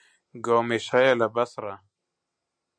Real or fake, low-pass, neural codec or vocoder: real; 9.9 kHz; none